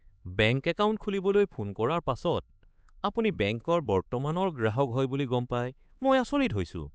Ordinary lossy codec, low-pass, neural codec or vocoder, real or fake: none; none; codec, 16 kHz, 4 kbps, X-Codec, HuBERT features, trained on LibriSpeech; fake